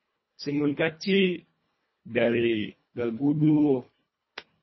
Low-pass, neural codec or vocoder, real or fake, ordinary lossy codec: 7.2 kHz; codec, 24 kHz, 1.5 kbps, HILCodec; fake; MP3, 24 kbps